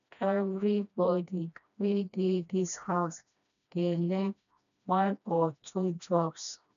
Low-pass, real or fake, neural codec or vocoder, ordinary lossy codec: 7.2 kHz; fake; codec, 16 kHz, 1 kbps, FreqCodec, smaller model; none